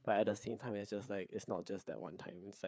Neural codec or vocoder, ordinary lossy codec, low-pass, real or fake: codec, 16 kHz, 4 kbps, FreqCodec, larger model; none; none; fake